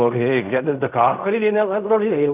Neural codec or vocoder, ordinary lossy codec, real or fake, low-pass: codec, 16 kHz in and 24 kHz out, 0.4 kbps, LongCat-Audio-Codec, fine tuned four codebook decoder; none; fake; 3.6 kHz